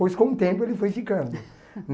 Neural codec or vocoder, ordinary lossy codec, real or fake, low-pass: none; none; real; none